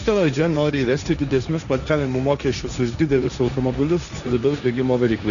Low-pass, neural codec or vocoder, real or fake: 7.2 kHz; codec, 16 kHz, 1.1 kbps, Voila-Tokenizer; fake